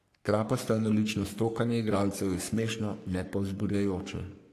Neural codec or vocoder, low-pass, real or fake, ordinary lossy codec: codec, 44.1 kHz, 3.4 kbps, Pupu-Codec; 14.4 kHz; fake; AAC, 64 kbps